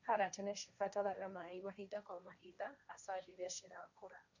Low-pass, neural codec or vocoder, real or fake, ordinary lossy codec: 7.2 kHz; codec, 16 kHz, 1.1 kbps, Voila-Tokenizer; fake; none